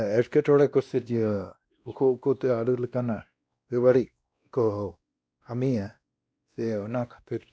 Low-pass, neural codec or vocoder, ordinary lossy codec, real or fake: none; codec, 16 kHz, 1 kbps, X-Codec, WavLM features, trained on Multilingual LibriSpeech; none; fake